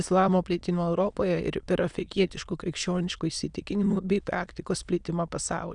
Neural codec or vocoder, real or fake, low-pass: autoencoder, 22.05 kHz, a latent of 192 numbers a frame, VITS, trained on many speakers; fake; 9.9 kHz